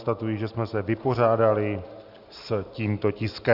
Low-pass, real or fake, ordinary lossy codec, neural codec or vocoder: 5.4 kHz; real; Opus, 64 kbps; none